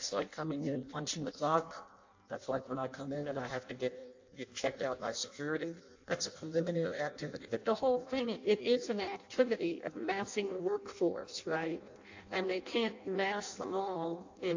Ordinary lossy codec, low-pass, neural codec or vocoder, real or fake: AAC, 48 kbps; 7.2 kHz; codec, 16 kHz in and 24 kHz out, 0.6 kbps, FireRedTTS-2 codec; fake